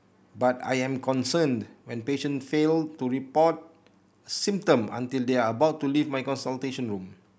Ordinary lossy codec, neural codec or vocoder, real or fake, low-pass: none; none; real; none